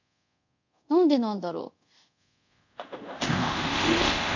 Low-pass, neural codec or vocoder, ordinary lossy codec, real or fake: 7.2 kHz; codec, 24 kHz, 0.5 kbps, DualCodec; none; fake